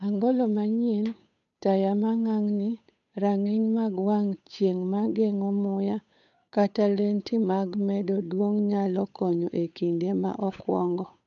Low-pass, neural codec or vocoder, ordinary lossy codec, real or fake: 7.2 kHz; codec, 16 kHz, 8 kbps, FunCodec, trained on Chinese and English, 25 frames a second; none; fake